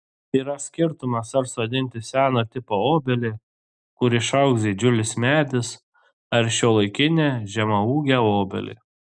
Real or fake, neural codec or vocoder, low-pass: real; none; 9.9 kHz